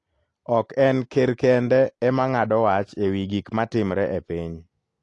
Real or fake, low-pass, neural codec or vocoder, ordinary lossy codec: real; 10.8 kHz; none; AAC, 48 kbps